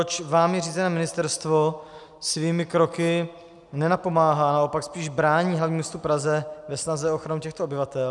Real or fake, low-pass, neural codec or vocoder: real; 10.8 kHz; none